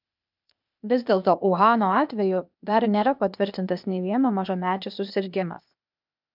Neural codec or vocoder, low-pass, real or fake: codec, 16 kHz, 0.8 kbps, ZipCodec; 5.4 kHz; fake